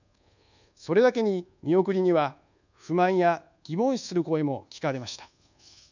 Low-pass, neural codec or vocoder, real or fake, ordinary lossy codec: 7.2 kHz; codec, 24 kHz, 1.2 kbps, DualCodec; fake; none